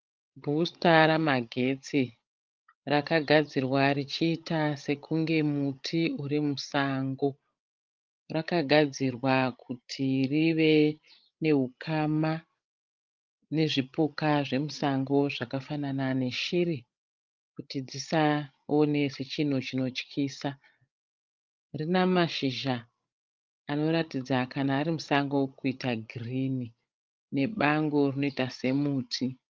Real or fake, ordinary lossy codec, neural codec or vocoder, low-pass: fake; Opus, 32 kbps; codec, 16 kHz, 16 kbps, FreqCodec, larger model; 7.2 kHz